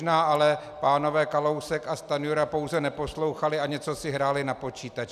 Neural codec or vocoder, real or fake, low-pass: none; real; 14.4 kHz